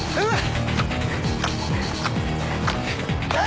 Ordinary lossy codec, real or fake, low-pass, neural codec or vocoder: none; real; none; none